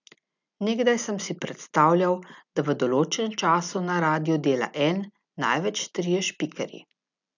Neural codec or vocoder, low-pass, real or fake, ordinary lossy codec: none; 7.2 kHz; real; none